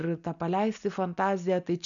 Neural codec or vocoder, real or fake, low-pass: none; real; 7.2 kHz